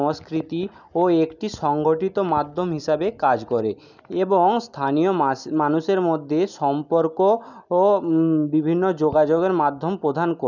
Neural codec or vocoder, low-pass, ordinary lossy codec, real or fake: none; 7.2 kHz; none; real